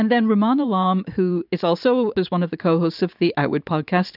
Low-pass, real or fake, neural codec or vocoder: 5.4 kHz; fake; vocoder, 44.1 kHz, 128 mel bands every 512 samples, BigVGAN v2